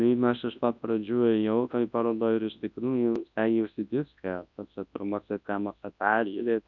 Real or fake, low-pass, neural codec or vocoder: fake; 7.2 kHz; codec, 24 kHz, 0.9 kbps, WavTokenizer, large speech release